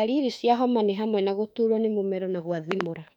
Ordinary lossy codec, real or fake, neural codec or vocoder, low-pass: none; fake; autoencoder, 48 kHz, 32 numbers a frame, DAC-VAE, trained on Japanese speech; 19.8 kHz